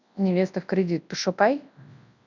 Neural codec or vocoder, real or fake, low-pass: codec, 24 kHz, 0.9 kbps, WavTokenizer, large speech release; fake; 7.2 kHz